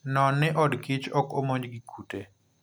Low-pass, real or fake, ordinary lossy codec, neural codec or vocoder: none; real; none; none